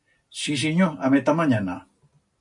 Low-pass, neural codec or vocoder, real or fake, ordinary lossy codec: 10.8 kHz; none; real; AAC, 64 kbps